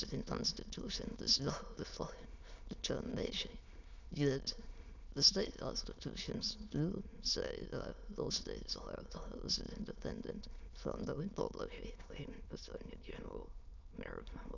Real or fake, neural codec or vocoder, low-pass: fake; autoencoder, 22.05 kHz, a latent of 192 numbers a frame, VITS, trained on many speakers; 7.2 kHz